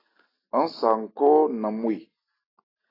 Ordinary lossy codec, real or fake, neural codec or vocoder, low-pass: AAC, 24 kbps; real; none; 5.4 kHz